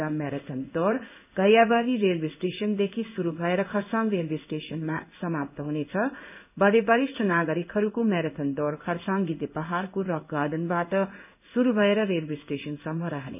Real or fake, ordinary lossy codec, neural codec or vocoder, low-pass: fake; none; codec, 16 kHz in and 24 kHz out, 1 kbps, XY-Tokenizer; 3.6 kHz